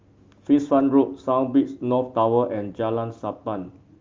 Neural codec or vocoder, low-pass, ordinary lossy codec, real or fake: none; 7.2 kHz; Opus, 32 kbps; real